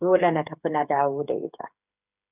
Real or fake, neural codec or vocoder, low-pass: fake; codec, 16 kHz, 8 kbps, FreqCodec, smaller model; 3.6 kHz